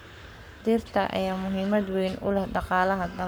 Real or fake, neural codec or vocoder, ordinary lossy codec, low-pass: fake; codec, 44.1 kHz, 7.8 kbps, Pupu-Codec; none; none